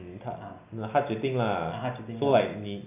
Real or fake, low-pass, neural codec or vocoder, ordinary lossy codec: real; 3.6 kHz; none; none